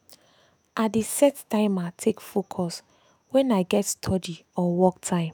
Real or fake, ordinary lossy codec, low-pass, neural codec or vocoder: fake; none; none; autoencoder, 48 kHz, 128 numbers a frame, DAC-VAE, trained on Japanese speech